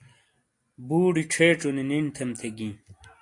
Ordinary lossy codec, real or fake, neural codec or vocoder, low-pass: AAC, 48 kbps; real; none; 10.8 kHz